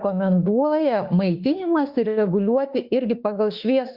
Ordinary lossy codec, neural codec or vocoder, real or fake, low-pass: Opus, 64 kbps; autoencoder, 48 kHz, 32 numbers a frame, DAC-VAE, trained on Japanese speech; fake; 5.4 kHz